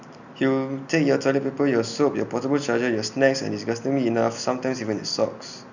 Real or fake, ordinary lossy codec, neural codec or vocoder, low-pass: real; none; none; 7.2 kHz